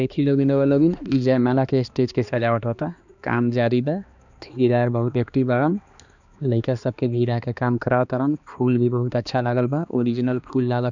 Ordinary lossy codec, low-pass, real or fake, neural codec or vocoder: none; 7.2 kHz; fake; codec, 16 kHz, 2 kbps, X-Codec, HuBERT features, trained on balanced general audio